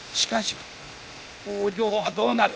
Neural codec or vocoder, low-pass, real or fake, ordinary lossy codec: codec, 16 kHz, 0.8 kbps, ZipCodec; none; fake; none